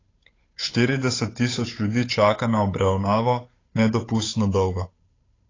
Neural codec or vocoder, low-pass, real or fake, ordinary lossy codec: codec, 16 kHz, 8 kbps, FunCodec, trained on Chinese and English, 25 frames a second; 7.2 kHz; fake; AAC, 32 kbps